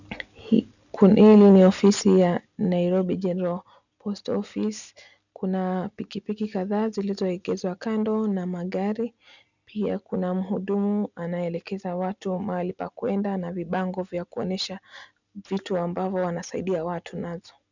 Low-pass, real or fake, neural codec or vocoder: 7.2 kHz; real; none